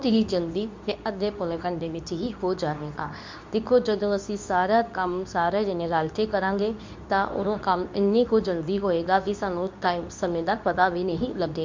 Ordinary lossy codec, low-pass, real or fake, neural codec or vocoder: MP3, 64 kbps; 7.2 kHz; fake; codec, 24 kHz, 0.9 kbps, WavTokenizer, medium speech release version 2